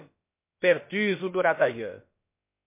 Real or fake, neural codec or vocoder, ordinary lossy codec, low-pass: fake; codec, 16 kHz, about 1 kbps, DyCAST, with the encoder's durations; MP3, 24 kbps; 3.6 kHz